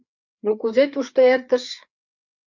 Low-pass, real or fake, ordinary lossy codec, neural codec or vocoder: 7.2 kHz; fake; MP3, 64 kbps; codec, 16 kHz, 4 kbps, FreqCodec, larger model